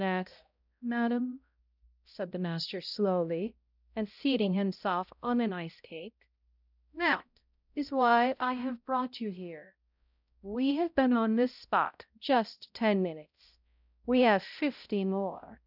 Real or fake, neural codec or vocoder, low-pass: fake; codec, 16 kHz, 0.5 kbps, X-Codec, HuBERT features, trained on balanced general audio; 5.4 kHz